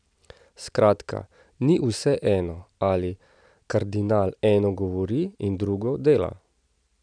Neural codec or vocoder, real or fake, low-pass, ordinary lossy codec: none; real; 9.9 kHz; none